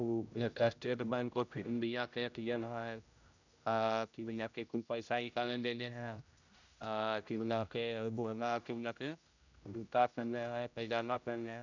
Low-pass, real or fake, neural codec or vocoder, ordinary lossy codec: 7.2 kHz; fake; codec, 16 kHz, 0.5 kbps, X-Codec, HuBERT features, trained on general audio; none